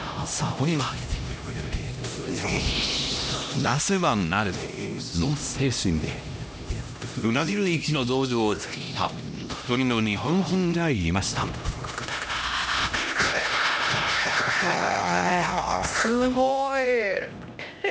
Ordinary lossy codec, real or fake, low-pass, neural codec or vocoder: none; fake; none; codec, 16 kHz, 1 kbps, X-Codec, HuBERT features, trained on LibriSpeech